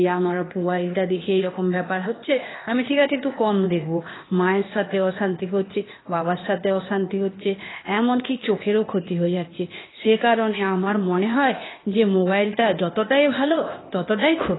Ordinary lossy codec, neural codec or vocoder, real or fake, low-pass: AAC, 16 kbps; codec, 16 kHz, 0.8 kbps, ZipCodec; fake; 7.2 kHz